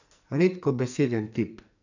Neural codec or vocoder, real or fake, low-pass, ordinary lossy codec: codec, 44.1 kHz, 2.6 kbps, SNAC; fake; 7.2 kHz; none